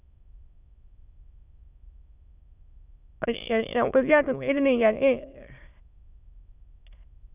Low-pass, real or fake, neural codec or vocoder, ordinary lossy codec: 3.6 kHz; fake; autoencoder, 22.05 kHz, a latent of 192 numbers a frame, VITS, trained on many speakers; none